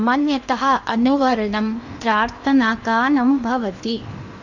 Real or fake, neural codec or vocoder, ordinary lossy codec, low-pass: fake; codec, 16 kHz in and 24 kHz out, 0.8 kbps, FocalCodec, streaming, 65536 codes; none; 7.2 kHz